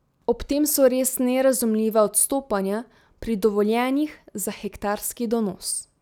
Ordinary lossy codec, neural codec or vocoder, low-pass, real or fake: none; none; 19.8 kHz; real